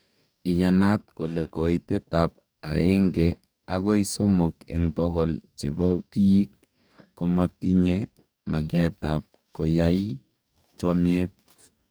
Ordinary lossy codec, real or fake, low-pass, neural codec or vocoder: none; fake; none; codec, 44.1 kHz, 2.6 kbps, DAC